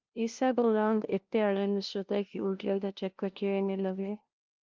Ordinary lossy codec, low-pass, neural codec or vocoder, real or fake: Opus, 32 kbps; 7.2 kHz; codec, 16 kHz, 0.5 kbps, FunCodec, trained on LibriTTS, 25 frames a second; fake